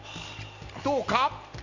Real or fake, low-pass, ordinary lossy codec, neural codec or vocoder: real; 7.2 kHz; none; none